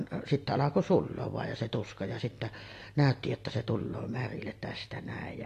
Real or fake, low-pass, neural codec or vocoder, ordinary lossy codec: real; 14.4 kHz; none; AAC, 48 kbps